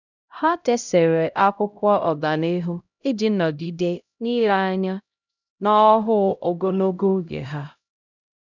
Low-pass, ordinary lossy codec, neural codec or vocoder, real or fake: 7.2 kHz; none; codec, 16 kHz, 0.5 kbps, X-Codec, HuBERT features, trained on LibriSpeech; fake